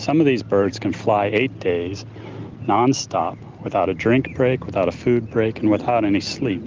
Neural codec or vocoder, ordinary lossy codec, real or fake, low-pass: none; Opus, 32 kbps; real; 7.2 kHz